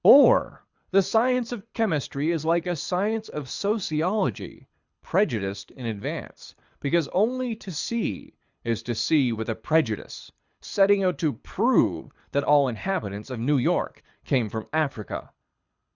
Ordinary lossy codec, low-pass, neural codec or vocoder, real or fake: Opus, 64 kbps; 7.2 kHz; codec, 24 kHz, 6 kbps, HILCodec; fake